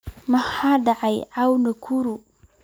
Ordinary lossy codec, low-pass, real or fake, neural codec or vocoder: none; none; real; none